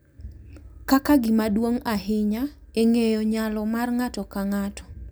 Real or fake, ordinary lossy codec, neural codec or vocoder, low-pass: real; none; none; none